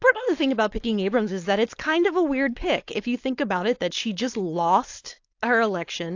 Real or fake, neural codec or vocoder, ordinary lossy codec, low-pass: fake; codec, 16 kHz, 4.8 kbps, FACodec; AAC, 48 kbps; 7.2 kHz